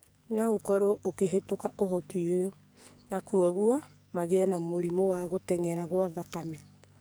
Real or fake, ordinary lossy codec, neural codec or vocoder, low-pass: fake; none; codec, 44.1 kHz, 2.6 kbps, SNAC; none